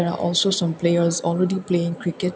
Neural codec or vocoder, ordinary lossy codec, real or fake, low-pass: none; none; real; none